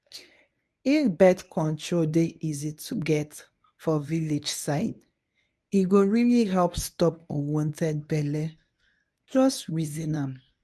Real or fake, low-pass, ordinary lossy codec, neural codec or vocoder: fake; none; none; codec, 24 kHz, 0.9 kbps, WavTokenizer, medium speech release version 1